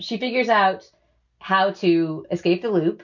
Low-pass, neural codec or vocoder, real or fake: 7.2 kHz; none; real